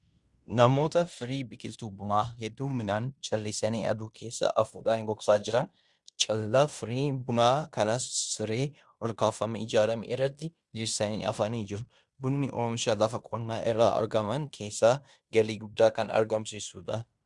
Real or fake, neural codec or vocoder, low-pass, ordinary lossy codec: fake; codec, 16 kHz in and 24 kHz out, 0.9 kbps, LongCat-Audio-Codec, fine tuned four codebook decoder; 10.8 kHz; Opus, 64 kbps